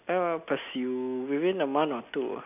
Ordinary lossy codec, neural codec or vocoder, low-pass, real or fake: none; none; 3.6 kHz; real